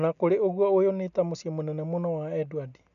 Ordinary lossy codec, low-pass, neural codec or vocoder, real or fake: none; 7.2 kHz; none; real